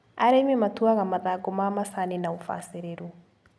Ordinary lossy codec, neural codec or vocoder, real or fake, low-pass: none; none; real; none